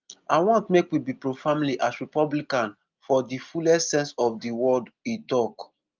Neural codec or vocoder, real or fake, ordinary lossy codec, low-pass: none; real; Opus, 24 kbps; 7.2 kHz